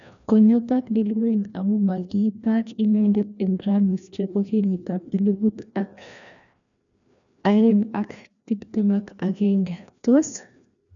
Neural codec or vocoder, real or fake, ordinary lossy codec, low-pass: codec, 16 kHz, 1 kbps, FreqCodec, larger model; fake; none; 7.2 kHz